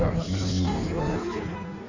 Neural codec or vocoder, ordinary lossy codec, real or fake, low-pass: codec, 16 kHz in and 24 kHz out, 1.1 kbps, FireRedTTS-2 codec; none; fake; 7.2 kHz